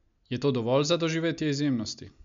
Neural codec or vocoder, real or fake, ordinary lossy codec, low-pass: none; real; MP3, 64 kbps; 7.2 kHz